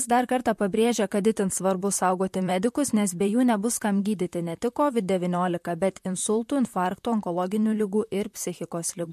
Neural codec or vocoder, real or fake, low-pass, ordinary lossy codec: vocoder, 44.1 kHz, 128 mel bands, Pupu-Vocoder; fake; 14.4 kHz; MP3, 64 kbps